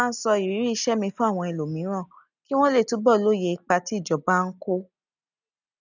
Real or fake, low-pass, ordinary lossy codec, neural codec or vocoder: real; 7.2 kHz; none; none